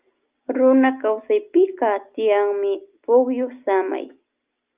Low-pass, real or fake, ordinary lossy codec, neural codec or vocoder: 3.6 kHz; real; Opus, 24 kbps; none